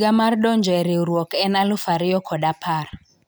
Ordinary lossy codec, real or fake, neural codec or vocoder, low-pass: none; real; none; none